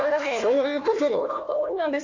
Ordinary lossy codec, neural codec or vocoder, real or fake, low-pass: AAC, 48 kbps; codec, 16 kHz, 1 kbps, FunCodec, trained on Chinese and English, 50 frames a second; fake; 7.2 kHz